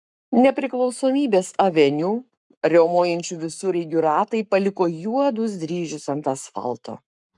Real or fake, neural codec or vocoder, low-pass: fake; codec, 44.1 kHz, 7.8 kbps, Pupu-Codec; 10.8 kHz